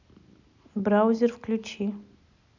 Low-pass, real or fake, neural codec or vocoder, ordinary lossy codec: 7.2 kHz; real; none; none